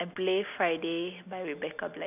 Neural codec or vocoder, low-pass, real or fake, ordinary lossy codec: none; 3.6 kHz; real; none